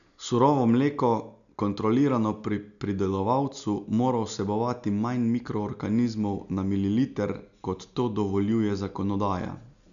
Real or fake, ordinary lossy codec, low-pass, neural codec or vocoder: real; none; 7.2 kHz; none